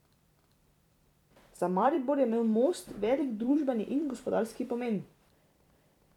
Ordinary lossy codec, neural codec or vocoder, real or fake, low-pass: none; vocoder, 44.1 kHz, 128 mel bands every 256 samples, BigVGAN v2; fake; 19.8 kHz